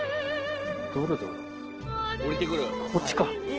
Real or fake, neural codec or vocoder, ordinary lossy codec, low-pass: real; none; Opus, 16 kbps; 7.2 kHz